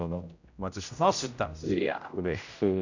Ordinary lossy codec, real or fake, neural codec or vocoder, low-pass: none; fake; codec, 16 kHz, 0.5 kbps, X-Codec, HuBERT features, trained on general audio; 7.2 kHz